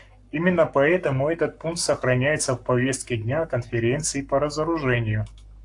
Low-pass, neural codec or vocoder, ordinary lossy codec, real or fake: 10.8 kHz; codec, 44.1 kHz, 7.8 kbps, Pupu-Codec; AAC, 64 kbps; fake